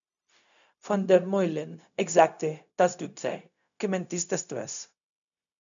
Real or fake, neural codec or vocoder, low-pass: fake; codec, 16 kHz, 0.4 kbps, LongCat-Audio-Codec; 7.2 kHz